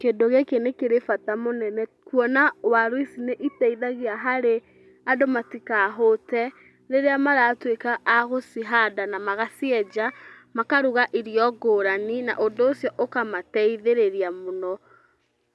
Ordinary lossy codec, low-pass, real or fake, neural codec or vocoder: none; none; real; none